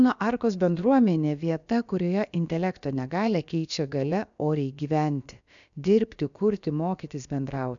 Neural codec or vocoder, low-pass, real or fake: codec, 16 kHz, about 1 kbps, DyCAST, with the encoder's durations; 7.2 kHz; fake